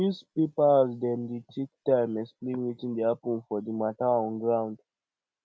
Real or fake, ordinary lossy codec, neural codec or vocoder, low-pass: real; none; none; none